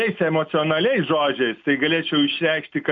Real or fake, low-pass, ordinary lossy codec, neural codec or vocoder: real; 7.2 kHz; AAC, 64 kbps; none